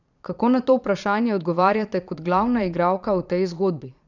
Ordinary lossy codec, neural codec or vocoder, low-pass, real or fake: none; vocoder, 22.05 kHz, 80 mel bands, Vocos; 7.2 kHz; fake